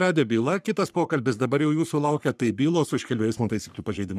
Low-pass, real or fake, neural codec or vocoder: 14.4 kHz; fake; codec, 44.1 kHz, 3.4 kbps, Pupu-Codec